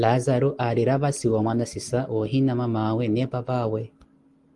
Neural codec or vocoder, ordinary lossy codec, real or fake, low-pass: none; Opus, 24 kbps; real; 10.8 kHz